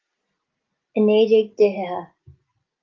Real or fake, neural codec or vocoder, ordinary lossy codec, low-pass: real; none; Opus, 32 kbps; 7.2 kHz